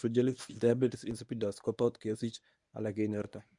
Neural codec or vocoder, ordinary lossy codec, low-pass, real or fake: codec, 24 kHz, 0.9 kbps, WavTokenizer, medium speech release version 1; none; 10.8 kHz; fake